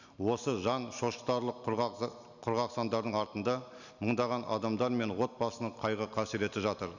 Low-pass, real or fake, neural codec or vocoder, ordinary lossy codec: 7.2 kHz; real; none; none